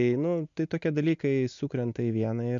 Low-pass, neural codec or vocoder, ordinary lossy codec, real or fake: 7.2 kHz; none; MP3, 64 kbps; real